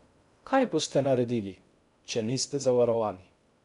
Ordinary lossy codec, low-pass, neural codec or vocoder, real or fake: none; 10.8 kHz; codec, 16 kHz in and 24 kHz out, 0.6 kbps, FocalCodec, streaming, 2048 codes; fake